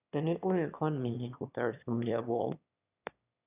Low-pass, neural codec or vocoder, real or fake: 3.6 kHz; autoencoder, 22.05 kHz, a latent of 192 numbers a frame, VITS, trained on one speaker; fake